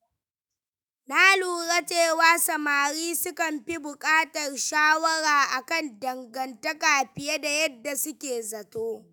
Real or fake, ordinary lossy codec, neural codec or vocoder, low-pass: fake; none; autoencoder, 48 kHz, 128 numbers a frame, DAC-VAE, trained on Japanese speech; none